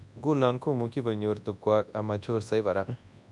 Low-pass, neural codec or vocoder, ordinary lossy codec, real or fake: 10.8 kHz; codec, 24 kHz, 0.9 kbps, WavTokenizer, large speech release; MP3, 96 kbps; fake